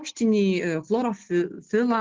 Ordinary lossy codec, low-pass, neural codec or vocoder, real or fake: Opus, 16 kbps; 7.2 kHz; none; real